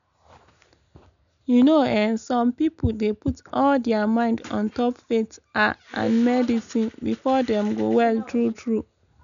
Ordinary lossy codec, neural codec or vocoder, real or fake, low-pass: none; none; real; 7.2 kHz